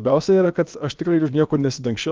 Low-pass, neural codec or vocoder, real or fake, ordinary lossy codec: 7.2 kHz; codec, 16 kHz, about 1 kbps, DyCAST, with the encoder's durations; fake; Opus, 32 kbps